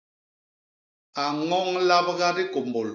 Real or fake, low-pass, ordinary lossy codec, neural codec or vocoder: real; 7.2 kHz; AAC, 48 kbps; none